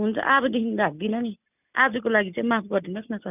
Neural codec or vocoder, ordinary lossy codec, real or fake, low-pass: none; none; real; 3.6 kHz